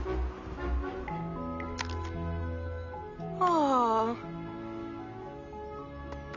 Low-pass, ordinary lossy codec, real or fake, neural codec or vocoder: 7.2 kHz; none; real; none